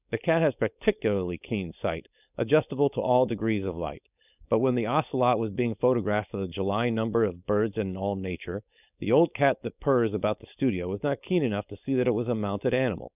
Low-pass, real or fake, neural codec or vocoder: 3.6 kHz; fake; codec, 16 kHz, 4.8 kbps, FACodec